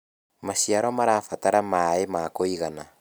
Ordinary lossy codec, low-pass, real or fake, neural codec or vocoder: none; none; real; none